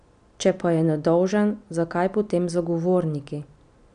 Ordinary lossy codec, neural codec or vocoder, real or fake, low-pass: none; none; real; 9.9 kHz